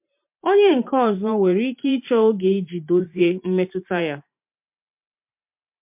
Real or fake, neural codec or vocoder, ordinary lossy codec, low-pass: fake; vocoder, 44.1 kHz, 128 mel bands every 512 samples, BigVGAN v2; MP3, 32 kbps; 3.6 kHz